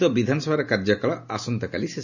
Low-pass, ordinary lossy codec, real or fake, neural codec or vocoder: 7.2 kHz; none; real; none